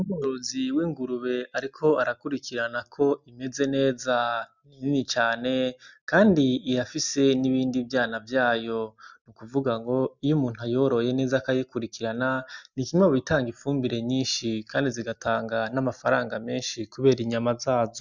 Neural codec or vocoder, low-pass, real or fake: none; 7.2 kHz; real